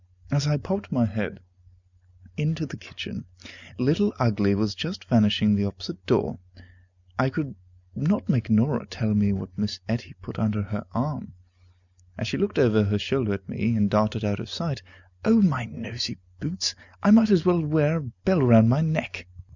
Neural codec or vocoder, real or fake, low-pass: none; real; 7.2 kHz